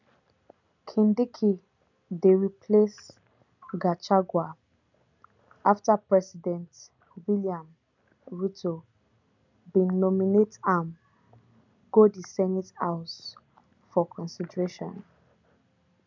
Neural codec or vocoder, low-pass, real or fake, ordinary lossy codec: none; 7.2 kHz; real; none